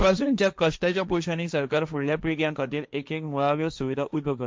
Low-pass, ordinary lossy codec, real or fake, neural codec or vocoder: none; none; fake; codec, 16 kHz, 1.1 kbps, Voila-Tokenizer